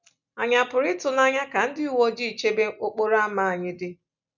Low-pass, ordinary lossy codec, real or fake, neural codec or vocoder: 7.2 kHz; none; real; none